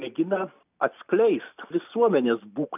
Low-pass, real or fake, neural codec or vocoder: 3.6 kHz; real; none